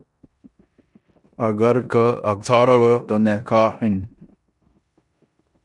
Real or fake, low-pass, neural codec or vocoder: fake; 10.8 kHz; codec, 16 kHz in and 24 kHz out, 0.9 kbps, LongCat-Audio-Codec, four codebook decoder